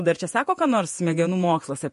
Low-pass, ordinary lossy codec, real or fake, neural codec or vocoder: 14.4 kHz; MP3, 48 kbps; fake; vocoder, 48 kHz, 128 mel bands, Vocos